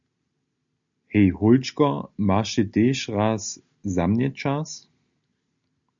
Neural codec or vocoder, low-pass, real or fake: none; 7.2 kHz; real